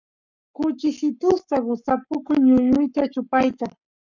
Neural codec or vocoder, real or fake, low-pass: codec, 44.1 kHz, 7.8 kbps, Pupu-Codec; fake; 7.2 kHz